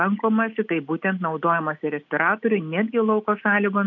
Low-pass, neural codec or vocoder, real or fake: 7.2 kHz; none; real